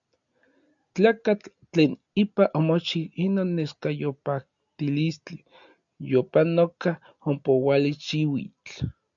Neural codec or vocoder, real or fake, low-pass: none; real; 7.2 kHz